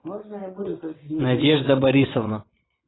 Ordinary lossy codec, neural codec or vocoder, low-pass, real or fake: AAC, 16 kbps; vocoder, 22.05 kHz, 80 mel bands, WaveNeXt; 7.2 kHz; fake